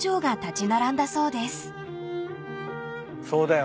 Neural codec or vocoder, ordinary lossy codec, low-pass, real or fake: none; none; none; real